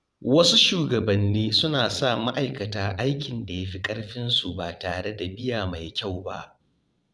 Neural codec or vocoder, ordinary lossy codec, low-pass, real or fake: vocoder, 22.05 kHz, 80 mel bands, Vocos; none; none; fake